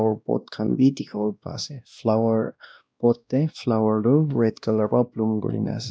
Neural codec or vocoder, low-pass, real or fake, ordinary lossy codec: codec, 16 kHz, 1 kbps, X-Codec, WavLM features, trained on Multilingual LibriSpeech; none; fake; none